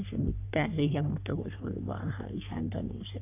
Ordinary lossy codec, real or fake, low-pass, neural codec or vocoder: none; fake; 3.6 kHz; codec, 44.1 kHz, 3.4 kbps, Pupu-Codec